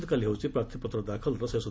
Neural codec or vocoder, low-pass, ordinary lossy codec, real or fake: none; none; none; real